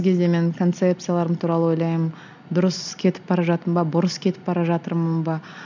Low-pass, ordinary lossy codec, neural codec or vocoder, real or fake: 7.2 kHz; none; none; real